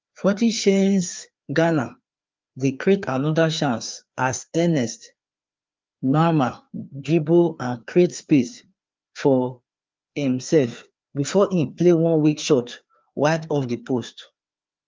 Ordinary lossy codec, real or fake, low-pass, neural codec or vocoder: Opus, 32 kbps; fake; 7.2 kHz; codec, 16 kHz, 2 kbps, FreqCodec, larger model